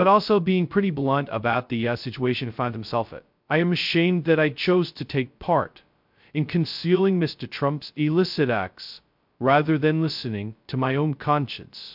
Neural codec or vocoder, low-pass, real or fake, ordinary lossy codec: codec, 16 kHz, 0.2 kbps, FocalCodec; 5.4 kHz; fake; MP3, 48 kbps